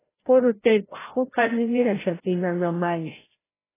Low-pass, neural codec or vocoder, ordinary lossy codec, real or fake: 3.6 kHz; codec, 16 kHz, 0.5 kbps, FreqCodec, larger model; AAC, 16 kbps; fake